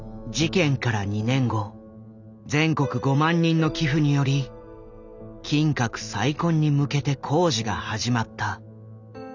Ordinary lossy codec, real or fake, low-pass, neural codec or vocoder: none; real; 7.2 kHz; none